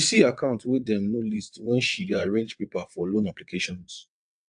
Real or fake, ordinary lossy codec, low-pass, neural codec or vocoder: fake; AAC, 64 kbps; 9.9 kHz; vocoder, 22.05 kHz, 80 mel bands, WaveNeXt